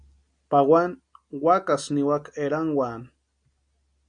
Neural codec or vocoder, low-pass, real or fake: none; 9.9 kHz; real